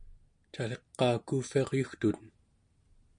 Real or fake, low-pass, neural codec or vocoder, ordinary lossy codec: real; 9.9 kHz; none; MP3, 64 kbps